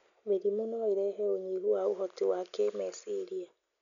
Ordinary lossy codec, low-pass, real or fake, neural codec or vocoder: none; 7.2 kHz; real; none